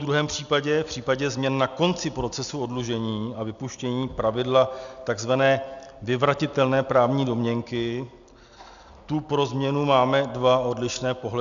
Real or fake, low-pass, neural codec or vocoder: real; 7.2 kHz; none